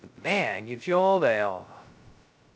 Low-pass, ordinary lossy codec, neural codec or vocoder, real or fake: none; none; codec, 16 kHz, 0.2 kbps, FocalCodec; fake